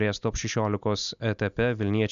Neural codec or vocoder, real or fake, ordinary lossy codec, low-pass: none; real; AAC, 96 kbps; 7.2 kHz